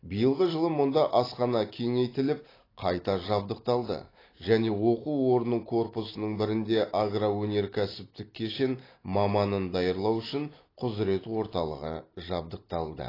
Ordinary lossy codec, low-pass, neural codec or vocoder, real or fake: AAC, 24 kbps; 5.4 kHz; vocoder, 44.1 kHz, 128 mel bands every 256 samples, BigVGAN v2; fake